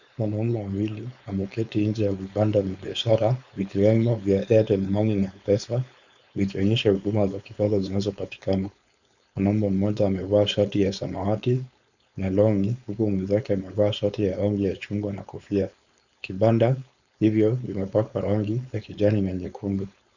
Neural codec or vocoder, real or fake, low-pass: codec, 16 kHz, 4.8 kbps, FACodec; fake; 7.2 kHz